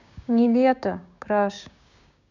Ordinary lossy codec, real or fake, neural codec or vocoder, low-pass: none; fake; codec, 16 kHz, 6 kbps, DAC; 7.2 kHz